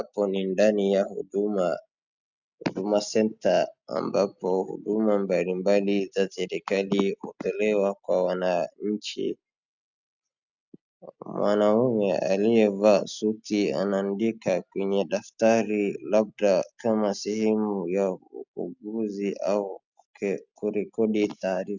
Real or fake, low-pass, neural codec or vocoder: real; 7.2 kHz; none